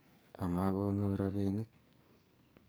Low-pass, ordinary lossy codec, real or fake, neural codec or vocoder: none; none; fake; codec, 44.1 kHz, 2.6 kbps, SNAC